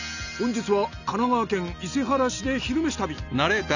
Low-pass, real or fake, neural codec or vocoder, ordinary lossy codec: 7.2 kHz; real; none; none